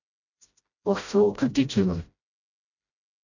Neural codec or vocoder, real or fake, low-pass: codec, 16 kHz, 0.5 kbps, FreqCodec, smaller model; fake; 7.2 kHz